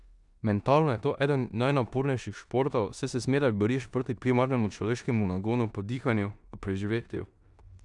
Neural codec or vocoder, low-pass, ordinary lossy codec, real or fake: codec, 16 kHz in and 24 kHz out, 0.9 kbps, LongCat-Audio-Codec, four codebook decoder; 10.8 kHz; none; fake